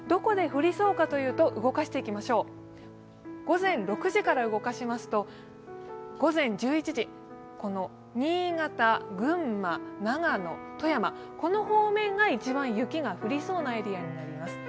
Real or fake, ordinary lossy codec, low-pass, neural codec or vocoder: real; none; none; none